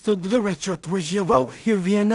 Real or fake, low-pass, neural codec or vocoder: fake; 10.8 kHz; codec, 16 kHz in and 24 kHz out, 0.4 kbps, LongCat-Audio-Codec, two codebook decoder